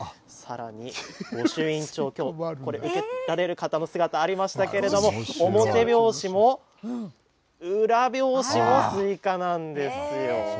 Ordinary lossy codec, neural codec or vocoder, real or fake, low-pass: none; none; real; none